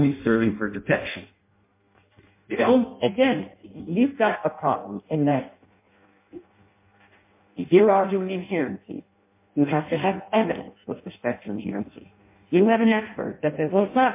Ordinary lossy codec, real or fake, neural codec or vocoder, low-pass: MP3, 24 kbps; fake; codec, 16 kHz in and 24 kHz out, 0.6 kbps, FireRedTTS-2 codec; 3.6 kHz